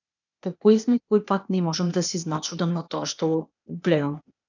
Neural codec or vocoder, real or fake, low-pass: codec, 16 kHz, 0.8 kbps, ZipCodec; fake; 7.2 kHz